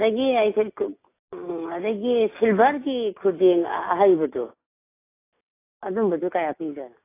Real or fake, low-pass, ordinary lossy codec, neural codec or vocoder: real; 3.6 kHz; AAC, 24 kbps; none